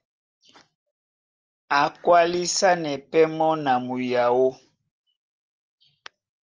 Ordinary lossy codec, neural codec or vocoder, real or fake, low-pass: Opus, 24 kbps; none; real; 7.2 kHz